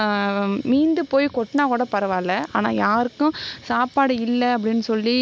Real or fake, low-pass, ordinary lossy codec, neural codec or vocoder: real; none; none; none